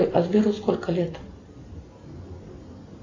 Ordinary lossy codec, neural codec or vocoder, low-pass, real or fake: AAC, 32 kbps; none; 7.2 kHz; real